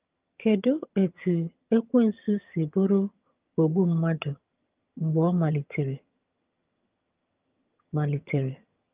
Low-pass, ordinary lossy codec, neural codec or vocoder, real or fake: 3.6 kHz; Opus, 24 kbps; vocoder, 22.05 kHz, 80 mel bands, HiFi-GAN; fake